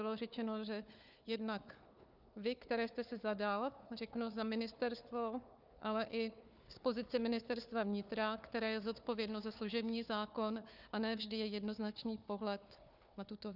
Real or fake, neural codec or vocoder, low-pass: fake; codec, 16 kHz, 4 kbps, FunCodec, trained on Chinese and English, 50 frames a second; 5.4 kHz